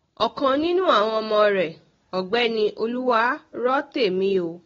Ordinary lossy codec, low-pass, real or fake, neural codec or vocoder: AAC, 24 kbps; 7.2 kHz; real; none